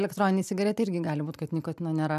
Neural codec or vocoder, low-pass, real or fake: none; 14.4 kHz; real